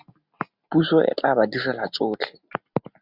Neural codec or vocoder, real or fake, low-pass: none; real; 5.4 kHz